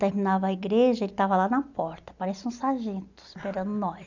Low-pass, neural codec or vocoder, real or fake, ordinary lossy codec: 7.2 kHz; none; real; none